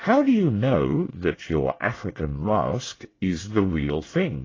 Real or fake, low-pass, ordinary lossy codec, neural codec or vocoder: fake; 7.2 kHz; AAC, 32 kbps; codec, 24 kHz, 1 kbps, SNAC